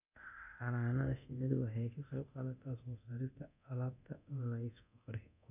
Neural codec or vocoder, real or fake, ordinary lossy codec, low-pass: codec, 24 kHz, 0.9 kbps, DualCodec; fake; none; 3.6 kHz